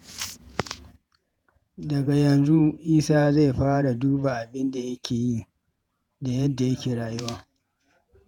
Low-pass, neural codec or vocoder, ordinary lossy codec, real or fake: 19.8 kHz; vocoder, 48 kHz, 128 mel bands, Vocos; none; fake